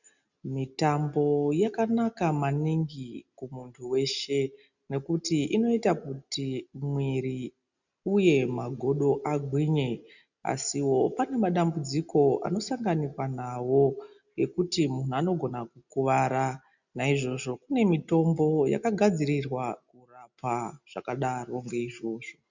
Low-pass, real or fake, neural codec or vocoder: 7.2 kHz; real; none